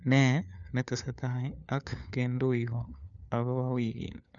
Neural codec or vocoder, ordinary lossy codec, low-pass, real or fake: codec, 16 kHz, 4 kbps, FunCodec, trained on LibriTTS, 50 frames a second; none; 7.2 kHz; fake